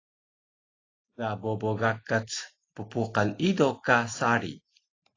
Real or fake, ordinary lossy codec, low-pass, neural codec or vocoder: real; AAC, 32 kbps; 7.2 kHz; none